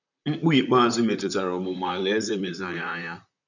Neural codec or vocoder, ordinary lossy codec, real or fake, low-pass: vocoder, 44.1 kHz, 128 mel bands, Pupu-Vocoder; none; fake; 7.2 kHz